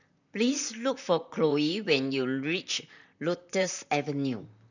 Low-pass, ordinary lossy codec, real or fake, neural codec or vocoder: 7.2 kHz; none; fake; vocoder, 44.1 kHz, 128 mel bands, Pupu-Vocoder